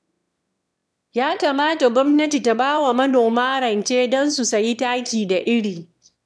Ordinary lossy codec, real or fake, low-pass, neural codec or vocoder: none; fake; none; autoencoder, 22.05 kHz, a latent of 192 numbers a frame, VITS, trained on one speaker